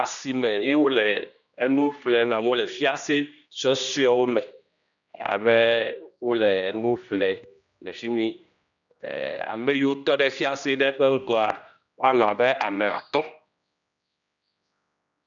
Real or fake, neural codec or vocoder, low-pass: fake; codec, 16 kHz, 1 kbps, X-Codec, HuBERT features, trained on general audio; 7.2 kHz